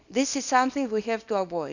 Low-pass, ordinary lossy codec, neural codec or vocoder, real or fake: 7.2 kHz; none; codec, 24 kHz, 0.9 kbps, WavTokenizer, small release; fake